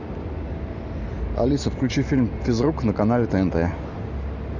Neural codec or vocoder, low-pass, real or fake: none; 7.2 kHz; real